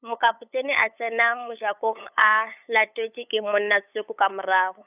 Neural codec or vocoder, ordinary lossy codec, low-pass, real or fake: codec, 16 kHz, 8 kbps, FunCodec, trained on LibriTTS, 25 frames a second; none; 3.6 kHz; fake